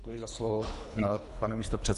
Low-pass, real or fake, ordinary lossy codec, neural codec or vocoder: 10.8 kHz; fake; AAC, 96 kbps; codec, 24 kHz, 3 kbps, HILCodec